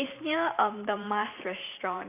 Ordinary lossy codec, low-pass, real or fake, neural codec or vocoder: none; 3.6 kHz; fake; vocoder, 44.1 kHz, 128 mel bands every 512 samples, BigVGAN v2